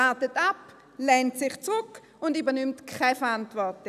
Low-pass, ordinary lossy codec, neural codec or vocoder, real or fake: 14.4 kHz; none; none; real